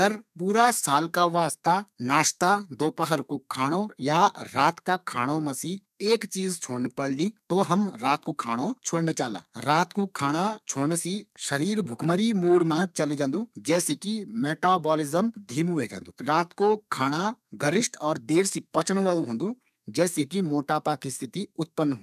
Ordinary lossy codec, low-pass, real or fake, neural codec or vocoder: none; 14.4 kHz; fake; codec, 32 kHz, 1.9 kbps, SNAC